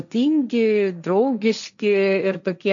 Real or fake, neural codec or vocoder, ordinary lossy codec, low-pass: fake; codec, 16 kHz, 1.1 kbps, Voila-Tokenizer; MP3, 64 kbps; 7.2 kHz